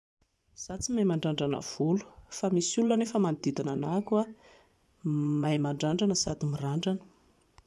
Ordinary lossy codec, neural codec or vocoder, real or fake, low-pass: none; vocoder, 24 kHz, 100 mel bands, Vocos; fake; none